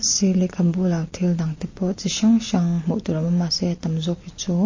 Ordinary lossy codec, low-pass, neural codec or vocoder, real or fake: MP3, 32 kbps; 7.2 kHz; none; real